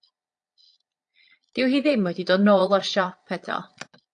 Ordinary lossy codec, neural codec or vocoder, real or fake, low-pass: AAC, 64 kbps; vocoder, 22.05 kHz, 80 mel bands, Vocos; fake; 9.9 kHz